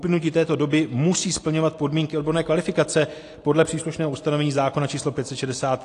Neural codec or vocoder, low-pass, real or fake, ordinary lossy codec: none; 10.8 kHz; real; AAC, 48 kbps